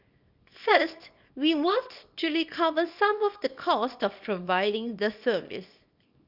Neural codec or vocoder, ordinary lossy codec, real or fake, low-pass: codec, 24 kHz, 0.9 kbps, WavTokenizer, small release; none; fake; 5.4 kHz